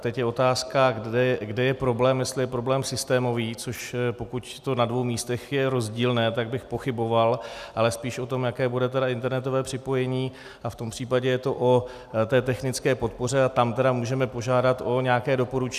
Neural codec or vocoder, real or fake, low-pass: none; real; 14.4 kHz